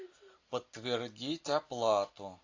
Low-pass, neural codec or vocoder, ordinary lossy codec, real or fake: 7.2 kHz; none; AAC, 48 kbps; real